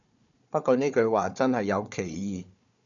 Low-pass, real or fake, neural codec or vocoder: 7.2 kHz; fake; codec, 16 kHz, 4 kbps, FunCodec, trained on Chinese and English, 50 frames a second